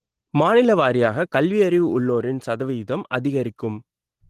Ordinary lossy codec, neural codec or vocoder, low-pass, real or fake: Opus, 16 kbps; none; 14.4 kHz; real